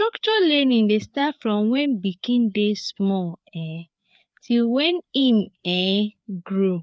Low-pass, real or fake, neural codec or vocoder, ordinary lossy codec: none; fake; codec, 16 kHz, 4 kbps, FreqCodec, larger model; none